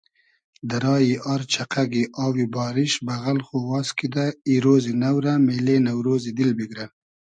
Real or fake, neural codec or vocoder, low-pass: real; none; 9.9 kHz